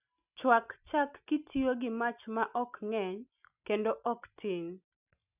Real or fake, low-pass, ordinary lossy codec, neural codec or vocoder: real; 3.6 kHz; none; none